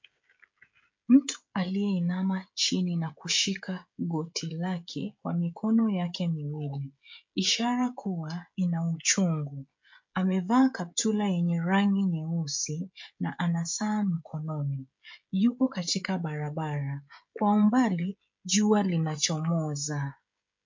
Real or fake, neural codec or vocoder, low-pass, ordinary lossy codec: fake; codec, 16 kHz, 16 kbps, FreqCodec, smaller model; 7.2 kHz; MP3, 48 kbps